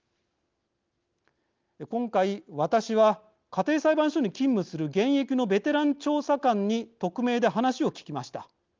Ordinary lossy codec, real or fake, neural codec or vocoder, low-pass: Opus, 24 kbps; real; none; 7.2 kHz